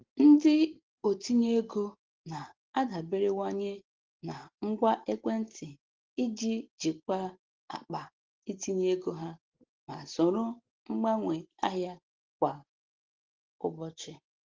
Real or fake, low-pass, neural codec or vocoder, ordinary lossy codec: real; 7.2 kHz; none; Opus, 16 kbps